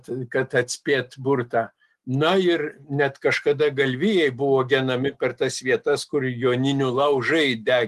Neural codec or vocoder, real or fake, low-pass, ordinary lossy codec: none; real; 14.4 kHz; Opus, 24 kbps